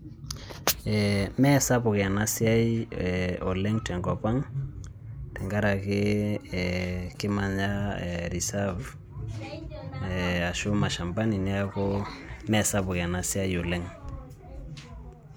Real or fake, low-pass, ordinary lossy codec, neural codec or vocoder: real; none; none; none